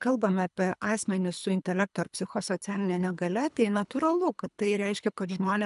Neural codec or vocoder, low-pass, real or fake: codec, 24 kHz, 3 kbps, HILCodec; 10.8 kHz; fake